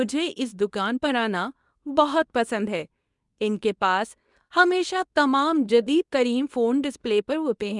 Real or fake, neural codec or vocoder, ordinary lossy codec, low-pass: fake; codec, 24 kHz, 0.9 kbps, WavTokenizer, medium speech release version 2; none; 10.8 kHz